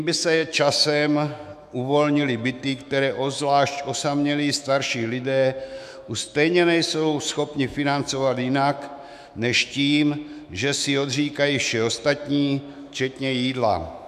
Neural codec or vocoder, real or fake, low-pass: autoencoder, 48 kHz, 128 numbers a frame, DAC-VAE, trained on Japanese speech; fake; 14.4 kHz